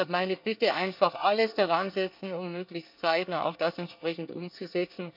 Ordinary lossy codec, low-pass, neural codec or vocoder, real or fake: none; 5.4 kHz; codec, 24 kHz, 1 kbps, SNAC; fake